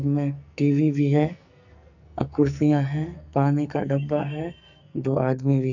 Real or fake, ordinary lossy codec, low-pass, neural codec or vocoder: fake; none; 7.2 kHz; codec, 44.1 kHz, 2.6 kbps, SNAC